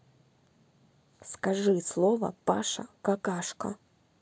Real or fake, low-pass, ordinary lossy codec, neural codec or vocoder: real; none; none; none